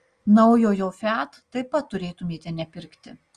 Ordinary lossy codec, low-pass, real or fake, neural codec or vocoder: Opus, 32 kbps; 9.9 kHz; real; none